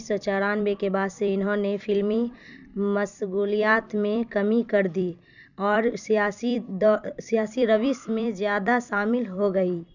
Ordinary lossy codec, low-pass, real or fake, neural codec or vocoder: none; 7.2 kHz; fake; vocoder, 44.1 kHz, 128 mel bands every 256 samples, BigVGAN v2